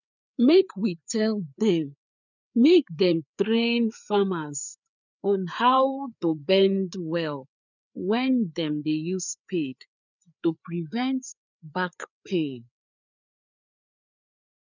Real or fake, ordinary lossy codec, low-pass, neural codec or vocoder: fake; none; 7.2 kHz; codec, 16 kHz, 4 kbps, FreqCodec, larger model